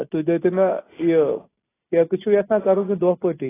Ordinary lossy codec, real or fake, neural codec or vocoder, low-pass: AAC, 16 kbps; real; none; 3.6 kHz